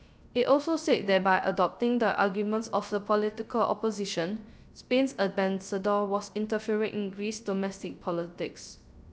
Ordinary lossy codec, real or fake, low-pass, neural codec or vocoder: none; fake; none; codec, 16 kHz, 0.3 kbps, FocalCodec